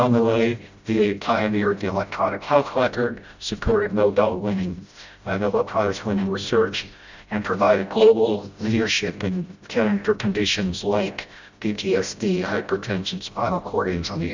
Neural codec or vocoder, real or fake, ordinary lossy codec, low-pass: codec, 16 kHz, 0.5 kbps, FreqCodec, smaller model; fake; Opus, 64 kbps; 7.2 kHz